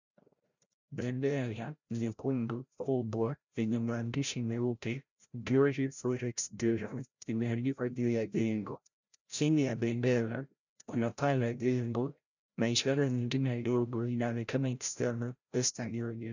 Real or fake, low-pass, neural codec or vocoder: fake; 7.2 kHz; codec, 16 kHz, 0.5 kbps, FreqCodec, larger model